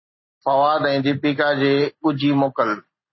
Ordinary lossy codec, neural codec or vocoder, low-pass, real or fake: MP3, 24 kbps; none; 7.2 kHz; real